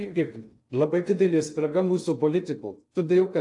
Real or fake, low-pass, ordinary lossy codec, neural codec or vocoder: fake; 10.8 kHz; AAC, 64 kbps; codec, 16 kHz in and 24 kHz out, 0.6 kbps, FocalCodec, streaming, 2048 codes